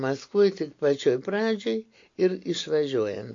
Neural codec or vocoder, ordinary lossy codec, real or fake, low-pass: none; AAC, 48 kbps; real; 7.2 kHz